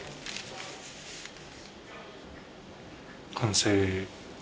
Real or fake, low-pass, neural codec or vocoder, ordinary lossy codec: real; none; none; none